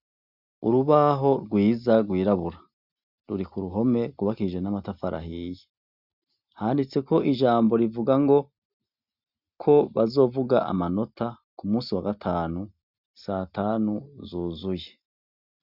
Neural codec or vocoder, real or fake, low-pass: none; real; 5.4 kHz